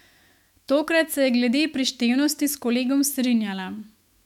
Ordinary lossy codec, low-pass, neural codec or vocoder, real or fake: MP3, 96 kbps; 19.8 kHz; autoencoder, 48 kHz, 128 numbers a frame, DAC-VAE, trained on Japanese speech; fake